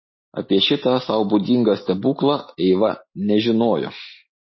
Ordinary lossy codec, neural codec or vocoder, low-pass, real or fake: MP3, 24 kbps; none; 7.2 kHz; real